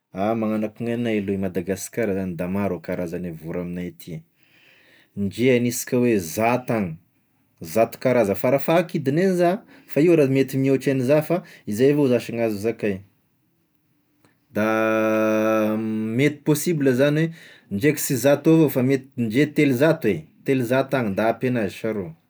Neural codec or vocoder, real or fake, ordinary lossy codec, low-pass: none; real; none; none